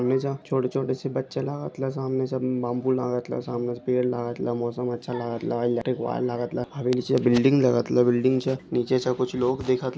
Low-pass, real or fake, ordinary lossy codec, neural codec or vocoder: none; real; none; none